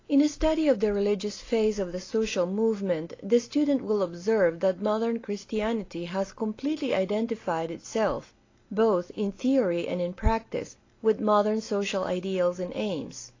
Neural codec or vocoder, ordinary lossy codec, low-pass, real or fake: none; AAC, 32 kbps; 7.2 kHz; real